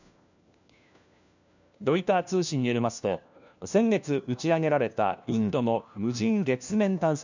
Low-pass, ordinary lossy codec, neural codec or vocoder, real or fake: 7.2 kHz; none; codec, 16 kHz, 1 kbps, FunCodec, trained on LibriTTS, 50 frames a second; fake